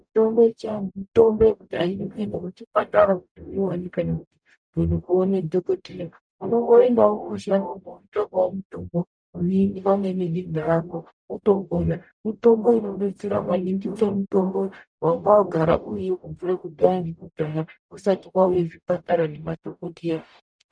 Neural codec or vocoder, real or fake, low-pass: codec, 44.1 kHz, 0.9 kbps, DAC; fake; 9.9 kHz